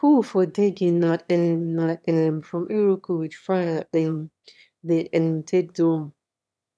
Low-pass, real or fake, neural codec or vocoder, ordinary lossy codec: none; fake; autoencoder, 22.05 kHz, a latent of 192 numbers a frame, VITS, trained on one speaker; none